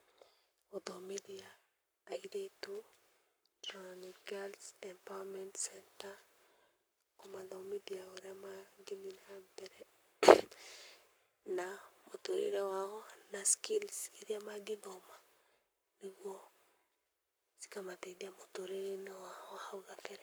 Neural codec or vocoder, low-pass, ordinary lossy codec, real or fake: codec, 44.1 kHz, 7.8 kbps, Pupu-Codec; none; none; fake